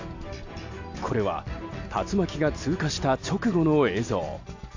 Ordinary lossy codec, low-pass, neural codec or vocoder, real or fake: AAC, 48 kbps; 7.2 kHz; none; real